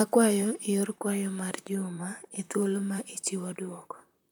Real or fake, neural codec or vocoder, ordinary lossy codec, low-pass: fake; vocoder, 44.1 kHz, 128 mel bands, Pupu-Vocoder; none; none